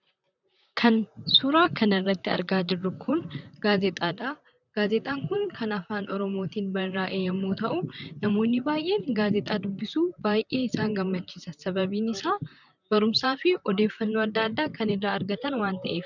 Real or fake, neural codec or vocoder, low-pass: fake; vocoder, 44.1 kHz, 128 mel bands, Pupu-Vocoder; 7.2 kHz